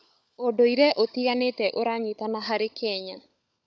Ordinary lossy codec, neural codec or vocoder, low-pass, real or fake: none; codec, 16 kHz, 16 kbps, FunCodec, trained on LibriTTS, 50 frames a second; none; fake